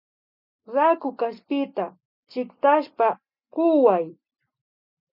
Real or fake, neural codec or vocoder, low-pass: real; none; 5.4 kHz